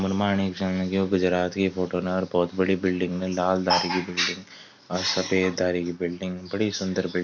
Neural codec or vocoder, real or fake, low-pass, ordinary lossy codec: none; real; 7.2 kHz; MP3, 64 kbps